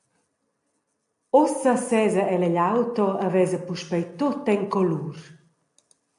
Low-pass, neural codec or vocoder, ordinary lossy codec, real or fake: 10.8 kHz; none; AAC, 64 kbps; real